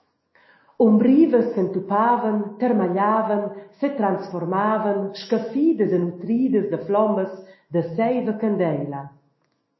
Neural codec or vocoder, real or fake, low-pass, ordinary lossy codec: autoencoder, 48 kHz, 128 numbers a frame, DAC-VAE, trained on Japanese speech; fake; 7.2 kHz; MP3, 24 kbps